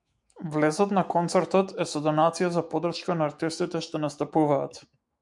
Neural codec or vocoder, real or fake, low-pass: autoencoder, 48 kHz, 128 numbers a frame, DAC-VAE, trained on Japanese speech; fake; 10.8 kHz